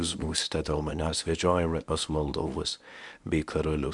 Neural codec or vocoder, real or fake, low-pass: codec, 24 kHz, 0.9 kbps, WavTokenizer, medium speech release version 1; fake; 10.8 kHz